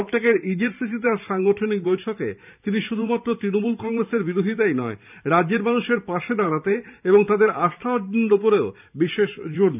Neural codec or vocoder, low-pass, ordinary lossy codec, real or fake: vocoder, 44.1 kHz, 128 mel bands every 512 samples, BigVGAN v2; 3.6 kHz; none; fake